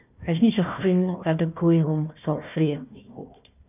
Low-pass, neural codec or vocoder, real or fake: 3.6 kHz; codec, 16 kHz, 1 kbps, FunCodec, trained on Chinese and English, 50 frames a second; fake